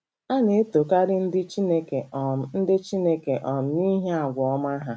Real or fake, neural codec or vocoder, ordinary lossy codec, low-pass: real; none; none; none